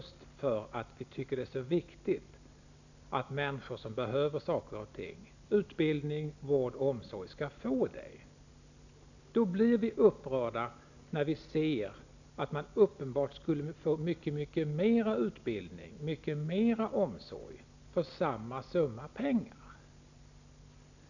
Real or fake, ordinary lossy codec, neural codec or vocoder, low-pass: real; none; none; 7.2 kHz